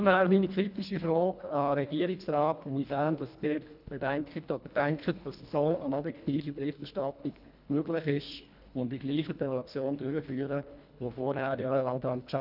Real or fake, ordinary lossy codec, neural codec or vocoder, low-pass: fake; none; codec, 24 kHz, 1.5 kbps, HILCodec; 5.4 kHz